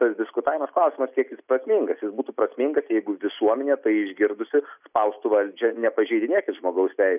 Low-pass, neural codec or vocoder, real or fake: 3.6 kHz; none; real